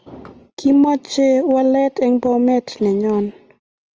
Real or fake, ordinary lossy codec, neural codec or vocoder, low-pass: real; Opus, 24 kbps; none; 7.2 kHz